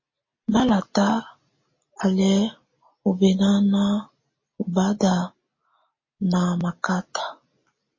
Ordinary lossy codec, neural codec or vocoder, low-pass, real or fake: MP3, 32 kbps; none; 7.2 kHz; real